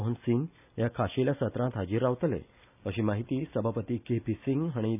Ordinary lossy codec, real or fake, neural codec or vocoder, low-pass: none; real; none; 3.6 kHz